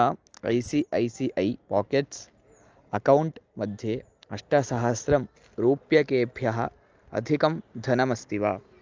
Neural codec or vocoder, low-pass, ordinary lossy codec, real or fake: none; 7.2 kHz; Opus, 24 kbps; real